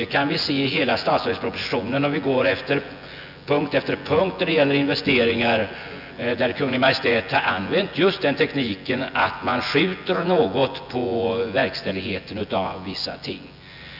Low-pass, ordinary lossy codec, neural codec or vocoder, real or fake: 5.4 kHz; none; vocoder, 24 kHz, 100 mel bands, Vocos; fake